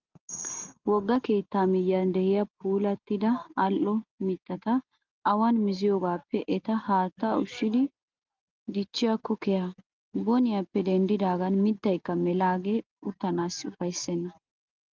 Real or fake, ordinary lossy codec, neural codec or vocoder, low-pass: real; Opus, 24 kbps; none; 7.2 kHz